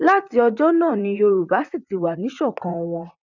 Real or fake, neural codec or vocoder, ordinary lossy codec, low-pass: fake; vocoder, 44.1 kHz, 128 mel bands, Pupu-Vocoder; none; 7.2 kHz